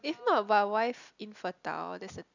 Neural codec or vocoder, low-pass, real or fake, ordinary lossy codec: none; 7.2 kHz; real; none